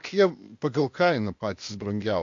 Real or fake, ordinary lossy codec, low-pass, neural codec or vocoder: fake; MP3, 48 kbps; 7.2 kHz; codec, 16 kHz, 0.8 kbps, ZipCodec